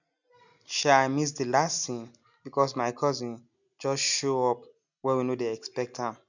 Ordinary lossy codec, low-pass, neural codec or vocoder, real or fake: none; 7.2 kHz; none; real